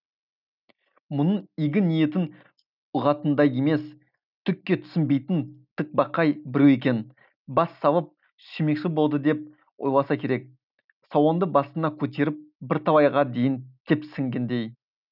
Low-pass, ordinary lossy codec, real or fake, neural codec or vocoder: 5.4 kHz; none; real; none